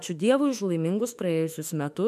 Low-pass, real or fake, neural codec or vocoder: 14.4 kHz; fake; autoencoder, 48 kHz, 32 numbers a frame, DAC-VAE, trained on Japanese speech